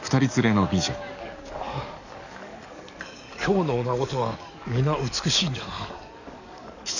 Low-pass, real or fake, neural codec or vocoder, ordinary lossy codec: 7.2 kHz; fake; codec, 44.1 kHz, 7.8 kbps, DAC; none